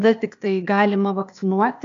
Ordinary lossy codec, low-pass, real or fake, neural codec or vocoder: MP3, 64 kbps; 7.2 kHz; fake; codec, 16 kHz, 0.8 kbps, ZipCodec